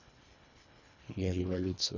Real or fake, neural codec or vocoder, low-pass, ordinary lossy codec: fake; codec, 24 kHz, 1.5 kbps, HILCodec; 7.2 kHz; none